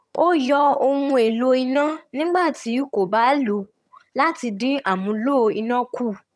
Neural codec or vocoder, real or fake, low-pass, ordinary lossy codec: vocoder, 22.05 kHz, 80 mel bands, HiFi-GAN; fake; none; none